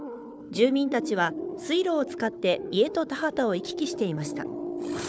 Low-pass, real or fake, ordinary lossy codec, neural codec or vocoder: none; fake; none; codec, 16 kHz, 4 kbps, FunCodec, trained on Chinese and English, 50 frames a second